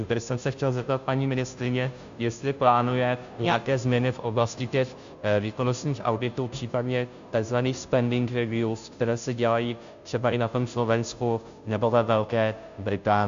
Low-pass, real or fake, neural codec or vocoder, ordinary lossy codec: 7.2 kHz; fake; codec, 16 kHz, 0.5 kbps, FunCodec, trained on Chinese and English, 25 frames a second; MP3, 64 kbps